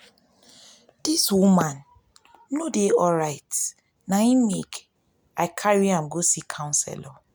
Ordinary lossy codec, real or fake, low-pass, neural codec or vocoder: none; real; none; none